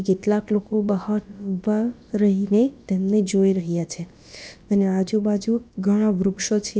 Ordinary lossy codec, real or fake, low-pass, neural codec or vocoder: none; fake; none; codec, 16 kHz, about 1 kbps, DyCAST, with the encoder's durations